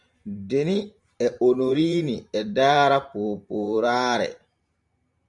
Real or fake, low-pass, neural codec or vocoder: fake; 10.8 kHz; vocoder, 44.1 kHz, 128 mel bands every 512 samples, BigVGAN v2